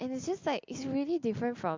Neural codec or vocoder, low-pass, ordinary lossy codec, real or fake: none; 7.2 kHz; MP3, 64 kbps; real